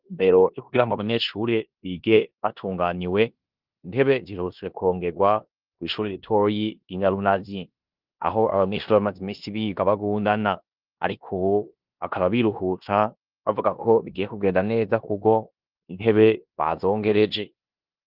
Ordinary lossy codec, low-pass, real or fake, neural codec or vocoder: Opus, 32 kbps; 5.4 kHz; fake; codec, 16 kHz in and 24 kHz out, 0.9 kbps, LongCat-Audio-Codec, four codebook decoder